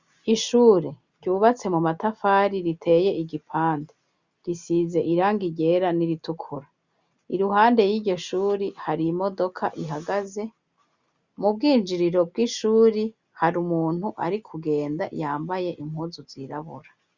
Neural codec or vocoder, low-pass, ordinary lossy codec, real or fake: none; 7.2 kHz; Opus, 64 kbps; real